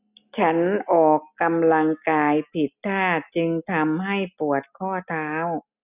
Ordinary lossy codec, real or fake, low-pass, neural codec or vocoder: none; real; 3.6 kHz; none